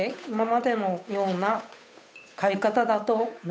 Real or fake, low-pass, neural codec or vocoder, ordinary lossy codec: fake; none; codec, 16 kHz, 8 kbps, FunCodec, trained on Chinese and English, 25 frames a second; none